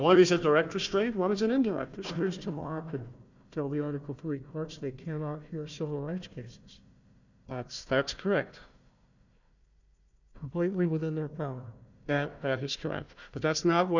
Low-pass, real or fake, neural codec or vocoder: 7.2 kHz; fake; codec, 16 kHz, 1 kbps, FunCodec, trained on Chinese and English, 50 frames a second